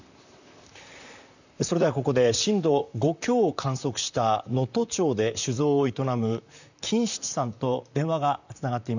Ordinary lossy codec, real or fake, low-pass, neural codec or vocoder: none; fake; 7.2 kHz; vocoder, 44.1 kHz, 128 mel bands, Pupu-Vocoder